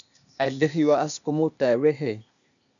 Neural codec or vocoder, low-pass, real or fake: codec, 16 kHz, 0.8 kbps, ZipCodec; 7.2 kHz; fake